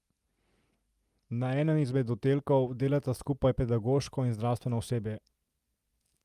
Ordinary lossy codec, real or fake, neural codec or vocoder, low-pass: Opus, 32 kbps; real; none; 14.4 kHz